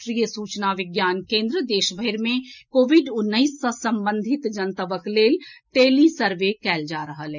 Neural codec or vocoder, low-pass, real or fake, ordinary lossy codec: none; 7.2 kHz; real; none